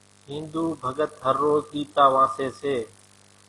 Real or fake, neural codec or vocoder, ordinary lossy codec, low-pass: real; none; AAC, 32 kbps; 10.8 kHz